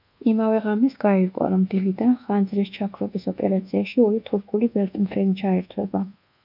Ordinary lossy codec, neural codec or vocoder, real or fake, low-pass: MP3, 48 kbps; codec, 24 kHz, 1.2 kbps, DualCodec; fake; 5.4 kHz